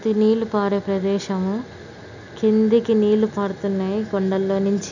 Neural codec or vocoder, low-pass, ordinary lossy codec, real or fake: none; 7.2 kHz; none; real